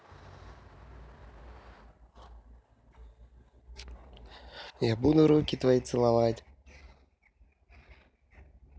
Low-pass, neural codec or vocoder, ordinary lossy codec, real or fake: none; none; none; real